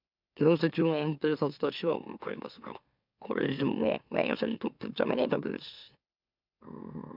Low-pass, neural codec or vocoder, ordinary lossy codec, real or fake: 5.4 kHz; autoencoder, 44.1 kHz, a latent of 192 numbers a frame, MeloTTS; none; fake